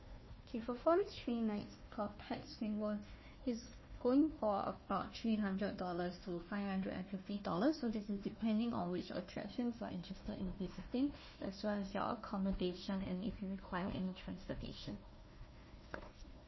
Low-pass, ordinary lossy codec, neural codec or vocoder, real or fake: 7.2 kHz; MP3, 24 kbps; codec, 16 kHz, 1 kbps, FunCodec, trained on Chinese and English, 50 frames a second; fake